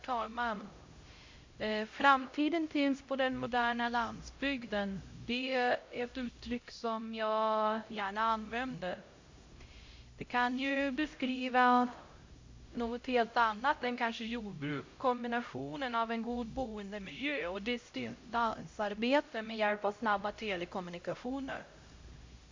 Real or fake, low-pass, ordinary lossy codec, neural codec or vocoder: fake; 7.2 kHz; MP3, 48 kbps; codec, 16 kHz, 0.5 kbps, X-Codec, HuBERT features, trained on LibriSpeech